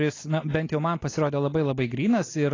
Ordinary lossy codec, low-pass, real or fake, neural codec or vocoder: AAC, 32 kbps; 7.2 kHz; real; none